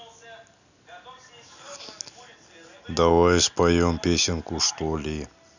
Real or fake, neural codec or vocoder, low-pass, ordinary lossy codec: real; none; 7.2 kHz; none